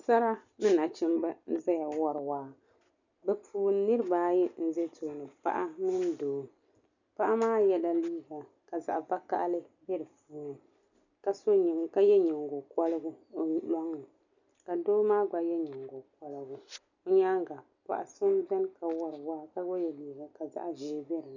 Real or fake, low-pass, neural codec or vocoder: real; 7.2 kHz; none